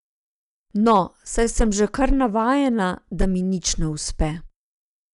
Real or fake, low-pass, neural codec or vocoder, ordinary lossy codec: real; 10.8 kHz; none; none